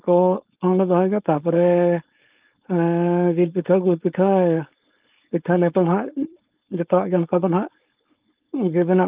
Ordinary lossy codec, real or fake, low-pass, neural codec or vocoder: Opus, 32 kbps; fake; 3.6 kHz; codec, 16 kHz, 4.8 kbps, FACodec